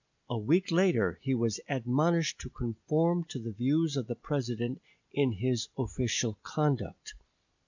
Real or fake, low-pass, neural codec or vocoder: real; 7.2 kHz; none